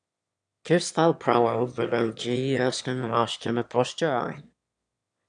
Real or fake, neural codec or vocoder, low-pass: fake; autoencoder, 22.05 kHz, a latent of 192 numbers a frame, VITS, trained on one speaker; 9.9 kHz